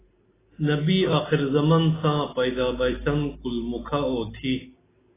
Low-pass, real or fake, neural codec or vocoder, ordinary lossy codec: 3.6 kHz; real; none; AAC, 16 kbps